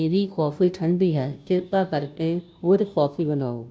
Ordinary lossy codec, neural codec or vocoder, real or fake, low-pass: none; codec, 16 kHz, 0.5 kbps, FunCodec, trained on Chinese and English, 25 frames a second; fake; none